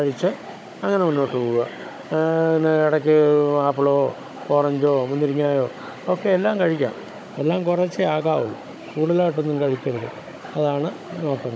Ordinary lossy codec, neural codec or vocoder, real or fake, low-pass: none; codec, 16 kHz, 16 kbps, FunCodec, trained on Chinese and English, 50 frames a second; fake; none